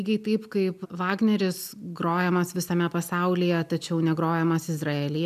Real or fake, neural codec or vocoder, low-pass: real; none; 14.4 kHz